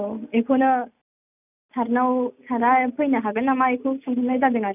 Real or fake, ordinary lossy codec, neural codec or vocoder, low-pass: real; none; none; 3.6 kHz